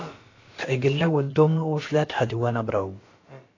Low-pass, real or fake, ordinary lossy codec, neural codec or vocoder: 7.2 kHz; fake; AAC, 32 kbps; codec, 16 kHz, about 1 kbps, DyCAST, with the encoder's durations